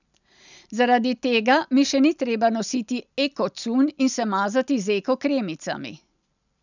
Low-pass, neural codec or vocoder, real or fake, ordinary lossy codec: 7.2 kHz; none; real; none